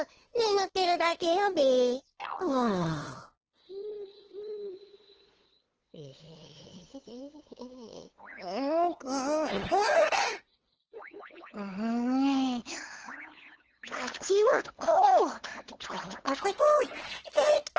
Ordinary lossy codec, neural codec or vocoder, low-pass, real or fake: Opus, 16 kbps; codec, 16 kHz, 2 kbps, FunCodec, trained on LibriTTS, 25 frames a second; 7.2 kHz; fake